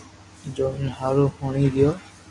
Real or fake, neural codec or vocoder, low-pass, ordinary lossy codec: real; none; 10.8 kHz; MP3, 48 kbps